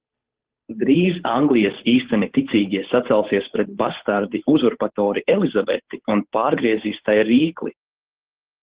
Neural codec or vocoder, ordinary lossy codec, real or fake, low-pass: codec, 16 kHz, 8 kbps, FunCodec, trained on Chinese and English, 25 frames a second; Opus, 16 kbps; fake; 3.6 kHz